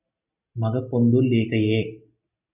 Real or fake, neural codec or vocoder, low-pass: real; none; 3.6 kHz